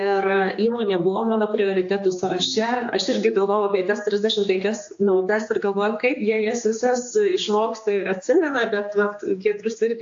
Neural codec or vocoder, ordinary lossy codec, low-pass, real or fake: codec, 16 kHz, 2 kbps, X-Codec, HuBERT features, trained on general audio; AAC, 48 kbps; 7.2 kHz; fake